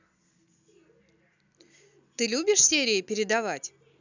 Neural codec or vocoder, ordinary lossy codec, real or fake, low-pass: none; none; real; 7.2 kHz